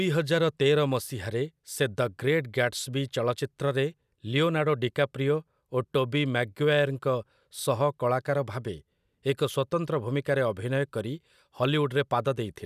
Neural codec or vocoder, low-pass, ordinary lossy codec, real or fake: none; 14.4 kHz; none; real